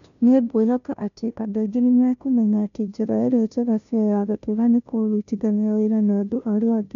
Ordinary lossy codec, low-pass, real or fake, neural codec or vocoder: none; 7.2 kHz; fake; codec, 16 kHz, 0.5 kbps, FunCodec, trained on Chinese and English, 25 frames a second